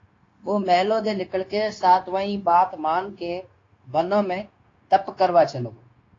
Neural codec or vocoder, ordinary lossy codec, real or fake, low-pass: codec, 16 kHz, 0.9 kbps, LongCat-Audio-Codec; AAC, 32 kbps; fake; 7.2 kHz